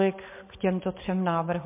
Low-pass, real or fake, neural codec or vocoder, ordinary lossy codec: 3.6 kHz; real; none; MP3, 24 kbps